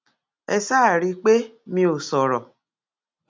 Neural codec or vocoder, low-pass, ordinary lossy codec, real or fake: none; none; none; real